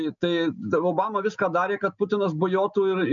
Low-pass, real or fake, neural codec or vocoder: 7.2 kHz; real; none